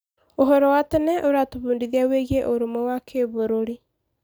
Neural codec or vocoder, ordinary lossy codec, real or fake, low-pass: none; none; real; none